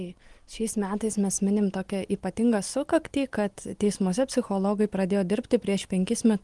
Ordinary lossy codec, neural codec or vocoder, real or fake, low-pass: Opus, 24 kbps; none; real; 10.8 kHz